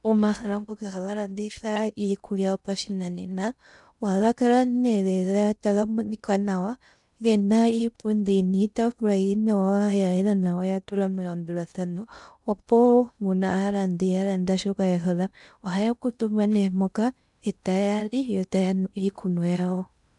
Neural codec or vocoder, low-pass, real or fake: codec, 16 kHz in and 24 kHz out, 0.6 kbps, FocalCodec, streaming, 2048 codes; 10.8 kHz; fake